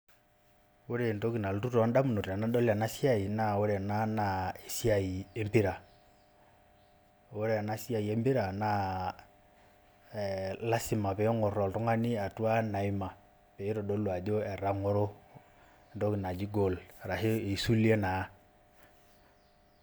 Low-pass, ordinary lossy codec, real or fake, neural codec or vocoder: none; none; real; none